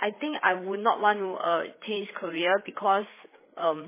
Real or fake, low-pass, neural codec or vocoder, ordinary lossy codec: fake; 3.6 kHz; codec, 16 kHz, 4 kbps, FreqCodec, larger model; MP3, 16 kbps